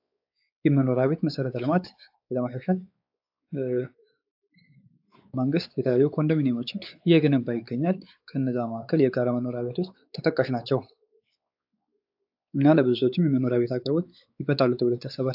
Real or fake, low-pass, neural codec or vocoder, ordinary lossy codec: fake; 5.4 kHz; codec, 16 kHz, 4 kbps, X-Codec, WavLM features, trained on Multilingual LibriSpeech; AAC, 48 kbps